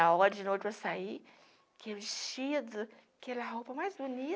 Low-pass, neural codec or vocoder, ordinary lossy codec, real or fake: none; none; none; real